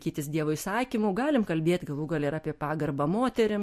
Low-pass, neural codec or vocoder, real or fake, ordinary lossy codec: 14.4 kHz; none; real; MP3, 64 kbps